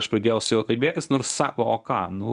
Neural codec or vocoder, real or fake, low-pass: codec, 24 kHz, 0.9 kbps, WavTokenizer, medium speech release version 1; fake; 10.8 kHz